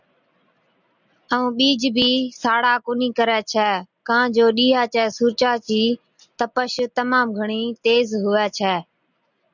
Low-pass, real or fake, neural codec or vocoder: 7.2 kHz; real; none